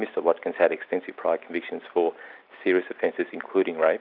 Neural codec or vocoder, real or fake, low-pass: none; real; 5.4 kHz